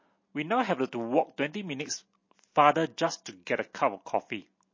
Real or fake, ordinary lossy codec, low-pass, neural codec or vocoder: real; MP3, 32 kbps; 7.2 kHz; none